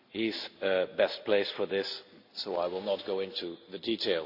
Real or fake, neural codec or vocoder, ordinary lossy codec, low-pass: real; none; none; 5.4 kHz